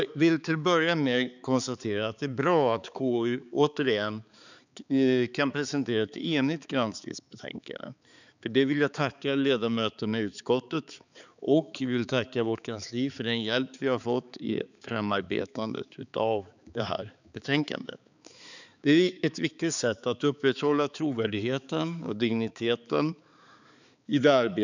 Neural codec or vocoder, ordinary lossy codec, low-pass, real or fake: codec, 16 kHz, 4 kbps, X-Codec, HuBERT features, trained on balanced general audio; none; 7.2 kHz; fake